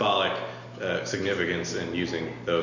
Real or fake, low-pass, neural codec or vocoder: real; 7.2 kHz; none